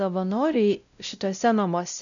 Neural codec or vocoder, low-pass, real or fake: codec, 16 kHz, 0.5 kbps, X-Codec, WavLM features, trained on Multilingual LibriSpeech; 7.2 kHz; fake